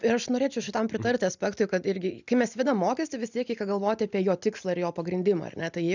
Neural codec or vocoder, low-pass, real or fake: none; 7.2 kHz; real